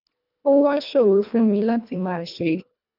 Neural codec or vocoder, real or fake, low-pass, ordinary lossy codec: codec, 24 kHz, 1.5 kbps, HILCodec; fake; 5.4 kHz; none